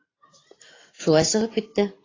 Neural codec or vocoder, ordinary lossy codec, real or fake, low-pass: vocoder, 44.1 kHz, 128 mel bands every 512 samples, BigVGAN v2; AAC, 32 kbps; fake; 7.2 kHz